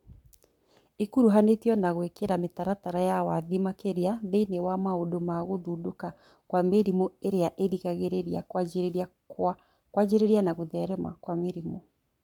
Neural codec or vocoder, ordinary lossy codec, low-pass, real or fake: codec, 44.1 kHz, 7.8 kbps, Pupu-Codec; none; 19.8 kHz; fake